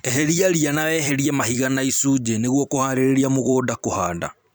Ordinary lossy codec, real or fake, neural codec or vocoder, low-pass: none; fake; vocoder, 44.1 kHz, 128 mel bands every 256 samples, BigVGAN v2; none